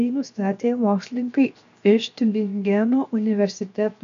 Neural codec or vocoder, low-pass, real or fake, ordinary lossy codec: codec, 16 kHz, 0.7 kbps, FocalCodec; 7.2 kHz; fake; AAC, 64 kbps